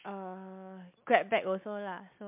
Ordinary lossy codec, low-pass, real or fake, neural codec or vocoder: MP3, 32 kbps; 3.6 kHz; real; none